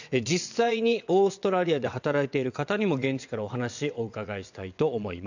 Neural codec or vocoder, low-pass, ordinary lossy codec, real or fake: vocoder, 22.05 kHz, 80 mel bands, WaveNeXt; 7.2 kHz; none; fake